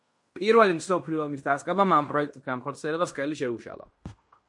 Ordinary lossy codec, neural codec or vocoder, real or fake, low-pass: MP3, 48 kbps; codec, 16 kHz in and 24 kHz out, 0.9 kbps, LongCat-Audio-Codec, fine tuned four codebook decoder; fake; 10.8 kHz